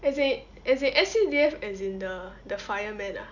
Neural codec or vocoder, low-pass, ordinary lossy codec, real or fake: none; 7.2 kHz; none; real